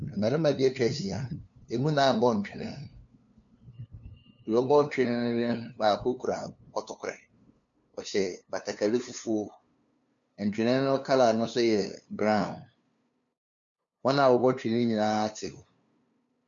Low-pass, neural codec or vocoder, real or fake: 7.2 kHz; codec, 16 kHz, 2 kbps, FunCodec, trained on LibriTTS, 25 frames a second; fake